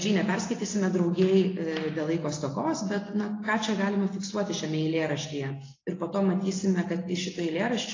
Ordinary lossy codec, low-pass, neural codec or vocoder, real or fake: AAC, 32 kbps; 7.2 kHz; none; real